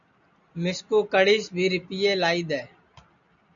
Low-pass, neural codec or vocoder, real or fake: 7.2 kHz; none; real